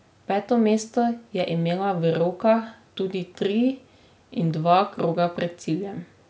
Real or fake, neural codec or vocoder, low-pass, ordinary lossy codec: real; none; none; none